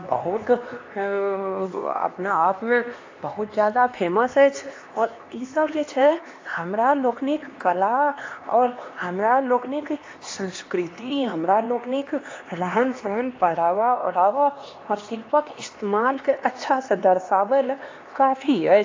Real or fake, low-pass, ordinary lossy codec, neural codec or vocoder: fake; 7.2 kHz; AAC, 32 kbps; codec, 16 kHz, 2 kbps, X-Codec, HuBERT features, trained on LibriSpeech